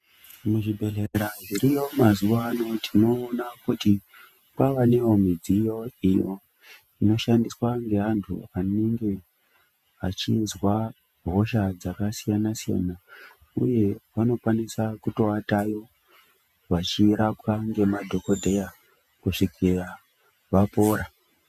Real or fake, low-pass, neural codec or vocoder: fake; 14.4 kHz; vocoder, 48 kHz, 128 mel bands, Vocos